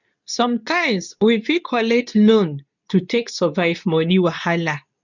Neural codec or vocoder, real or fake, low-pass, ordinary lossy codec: codec, 24 kHz, 0.9 kbps, WavTokenizer, medium speech release version 2; fake; 7.2 kHz; none